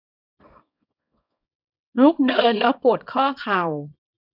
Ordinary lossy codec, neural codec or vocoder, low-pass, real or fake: none; codec, 24 kHz, 0.9 kbps, WavTokenizer, small release; 5.4 kHz; fake